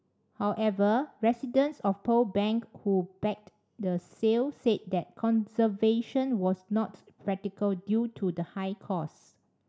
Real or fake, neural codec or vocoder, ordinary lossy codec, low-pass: real; none; none; none